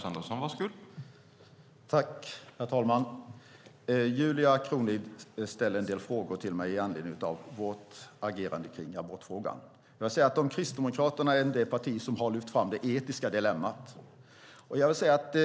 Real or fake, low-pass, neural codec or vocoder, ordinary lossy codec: real; none; none; none